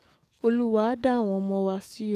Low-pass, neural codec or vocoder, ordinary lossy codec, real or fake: 14.4 kHz; codec, 44.1 kHz, 7.8 kbps, DAC; none; fake